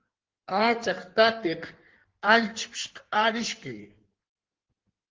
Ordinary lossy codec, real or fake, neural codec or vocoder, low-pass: Opus, 16 kbps; fake; codec, 16 kHz in and 24 kHz out, 1.1 kbps, FireRedTTS-2 codec; 7.2 kHz